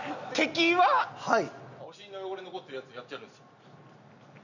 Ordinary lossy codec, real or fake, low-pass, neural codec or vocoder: none; real; 7.2 kHz; none